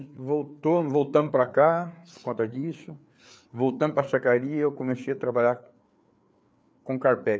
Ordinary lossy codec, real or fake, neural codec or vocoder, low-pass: none; fake; codec, 16 kHz, 4 kbps, FreqCodec, larger model; none